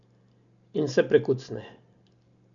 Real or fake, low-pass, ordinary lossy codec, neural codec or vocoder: real; 7.2 kHz; none; none